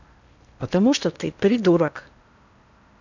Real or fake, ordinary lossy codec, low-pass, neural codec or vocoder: fake; none; 7.2 kHz; codec, 16 kHz in and 24 kHz out, 0.8 kbps, FocalCodec, streaming, 65536 codes